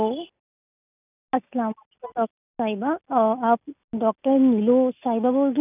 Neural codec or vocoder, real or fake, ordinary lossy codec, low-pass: none; real; none; 3.6 kHz